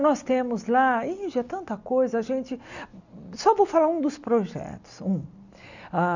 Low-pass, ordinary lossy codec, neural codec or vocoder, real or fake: 7.2 kHz; none; none; real